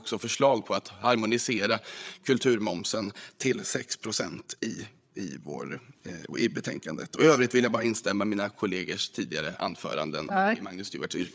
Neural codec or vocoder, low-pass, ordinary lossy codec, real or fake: codec, 16 kHz, 8 kbps, FreqCodec, larger model; none; none; fake